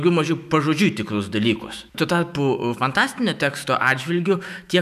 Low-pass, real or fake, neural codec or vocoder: 14.4 kHz; fake; autoencoder, 48 kHz, 128 numbers a frame, DAC-VAE, trained on Japanese speech